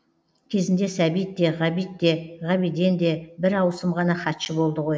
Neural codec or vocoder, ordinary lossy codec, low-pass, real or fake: none; none; none; real